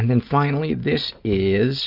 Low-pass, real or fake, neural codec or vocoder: 5.4 kHz; real; none